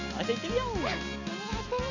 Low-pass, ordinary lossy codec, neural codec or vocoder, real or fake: 7.2 kHz; none; none; real